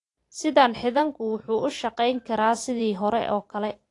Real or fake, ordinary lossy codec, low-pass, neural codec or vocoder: fake; AAC, 48 kbps; 10.8 kHz; vocoder, 44.1 kHz, 128 mel bands every 512 samples, BigVGAN v2